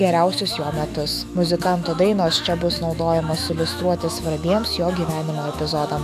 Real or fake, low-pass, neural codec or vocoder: fake; 14.4 kHz; autoencoder, 48 kHz, 128 numbers a frame, DAC-VAE, trained on Japanese speech